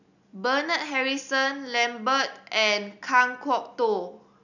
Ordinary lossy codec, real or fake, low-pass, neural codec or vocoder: none; real; 7.2 kHz; none